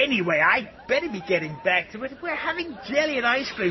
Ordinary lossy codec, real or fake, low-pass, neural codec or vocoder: MP3, 24 kbps; real; 7.2 kHz; none